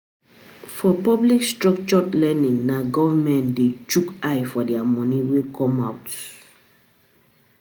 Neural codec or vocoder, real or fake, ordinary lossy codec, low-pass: none; real; none; none